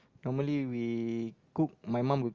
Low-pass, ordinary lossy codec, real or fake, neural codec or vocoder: 7.2 kHz; Opus, 32 kbps; real; none